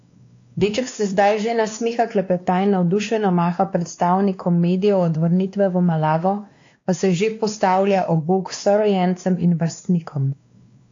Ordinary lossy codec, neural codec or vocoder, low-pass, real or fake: AAC, 48 kbps; codec, 16 kHz, 2 kbps, X-Codec, WavLM features, trained on Multilingual LibriSpeech; 7.2 kHz; fake